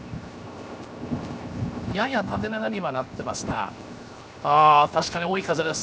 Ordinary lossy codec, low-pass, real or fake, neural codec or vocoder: none; none; fake; codec, 16 kHz, 0.7 kbps, FocalCodec